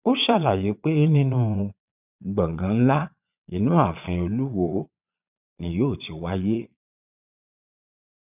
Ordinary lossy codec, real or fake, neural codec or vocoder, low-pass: none; fake; vocoder, 22.05 kHz, 80 mel bands, Vocos; 3.6 kHz